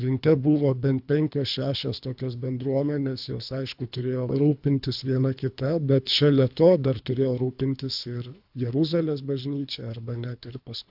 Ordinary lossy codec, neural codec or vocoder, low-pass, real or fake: AAC, 48 kbps; codec, 24 kHz, 3 kbps, HILCodec; 5.4 kHz; fake